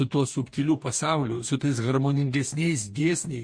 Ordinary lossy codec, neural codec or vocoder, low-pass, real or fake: MP3, 48 kbps; codec, 44.1 kHz, 2.6 kbps, DAC; 9.9 kHz; fake